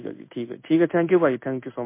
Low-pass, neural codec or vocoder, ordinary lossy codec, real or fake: 3.6 kHz; codec, 16 kHz in and 24 kHz out, 1 kbps, XY-Tokenizer; MP3, 24 kbps; fake